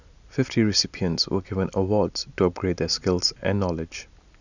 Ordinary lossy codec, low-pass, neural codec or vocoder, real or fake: none; 7.2 kHz; none; real